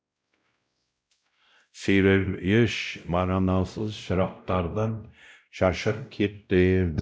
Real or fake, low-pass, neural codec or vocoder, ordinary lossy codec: fake; none; codec, 16 kHz, 0.5 kbps, X-Codec, WavLM features, trained on Multilingual LibriSpeech; none